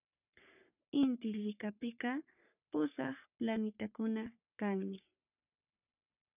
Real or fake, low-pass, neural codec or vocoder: fake; 3.6 kHz; codec, 44.1 kHz, 3.4 kbps, Pupu-Codec